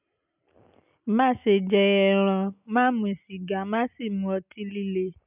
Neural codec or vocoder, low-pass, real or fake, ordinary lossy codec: none; 3.6 kHz; real; none